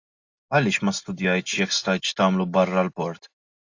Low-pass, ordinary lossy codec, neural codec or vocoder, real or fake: 7.2 kHz; AAC, 48 kbps; none; real